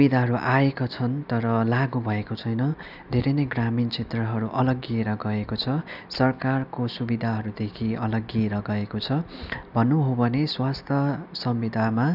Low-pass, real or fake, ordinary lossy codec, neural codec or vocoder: 5.4 kHz; real; none; none